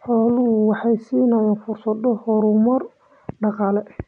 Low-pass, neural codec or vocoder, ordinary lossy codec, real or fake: 9.9 kHz; none; none; real